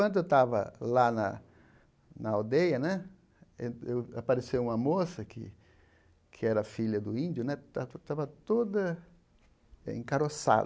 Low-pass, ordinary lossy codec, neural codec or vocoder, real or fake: none; none; none; real